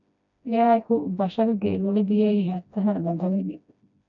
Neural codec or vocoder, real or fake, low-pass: codec, 16 kHz, 1 kbps, FreqCodec, smaller model; fake; 7.2 kHz